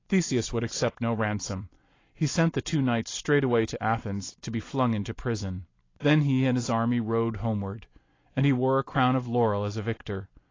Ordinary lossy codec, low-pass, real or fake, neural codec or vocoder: AAC, 32 kbps; 7.2 kHz; real; none